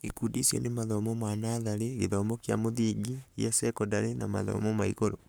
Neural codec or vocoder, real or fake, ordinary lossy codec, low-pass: codec, 44.1 kHz, 7.8 kbps, Pupu-Codec; fake; none; none